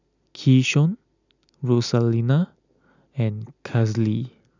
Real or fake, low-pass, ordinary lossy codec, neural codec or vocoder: real; 7.2 kHz; none; none